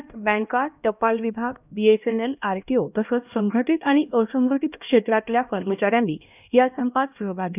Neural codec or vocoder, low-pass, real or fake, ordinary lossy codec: codec, 16 kHz, 1 kbps, X-Codec, HuBERT features, trained on LibriSpeech; 3.6 kHz; fake; none